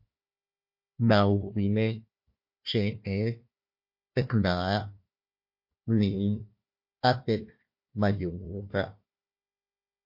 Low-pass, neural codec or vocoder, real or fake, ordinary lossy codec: 5.4 kHz; codec, 16 kHz, 1 kbps, FunCodec, trained on Chinese and English, 50 frames a second; fake; MP3, 32 kbps